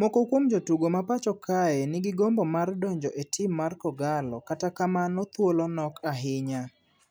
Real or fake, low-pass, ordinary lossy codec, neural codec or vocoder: real; none; none; none